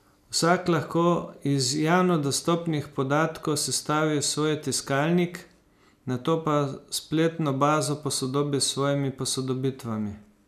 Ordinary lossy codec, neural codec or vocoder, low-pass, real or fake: none; none; 14.4 kHz; real